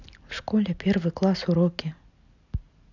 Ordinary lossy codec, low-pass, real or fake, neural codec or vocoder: none; 7.2 kHz; real; none